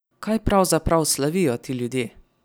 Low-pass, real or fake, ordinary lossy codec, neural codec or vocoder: none; real; none; none